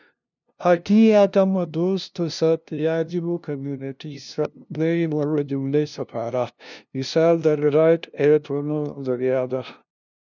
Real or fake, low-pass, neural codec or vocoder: fake; 7.2 kHz; codec, 16 kHz, 0.5 kbps, FunCodec, trained on LibriTTS, 25 frames a second